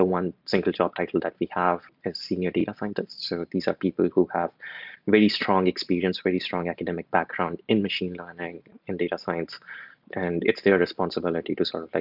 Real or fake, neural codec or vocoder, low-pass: real; none; 5.4 kHz